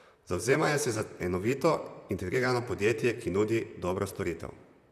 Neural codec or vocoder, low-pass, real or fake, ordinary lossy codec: vocoder, 44.1 kHz, 128 mel bands, Pupu-Vocoder; 14.4 kHz; fake; none